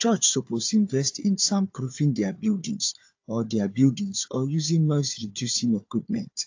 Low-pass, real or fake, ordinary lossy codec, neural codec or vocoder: 7.2 kHz; fake; AAC, 48 kbps; codec, 16 kHz, 4 kbps, FunCodec, trained on Chinese and English, 50 frames a second